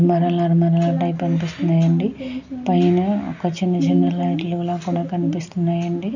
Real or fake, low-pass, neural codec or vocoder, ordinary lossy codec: real; 7.2 kHz; none; none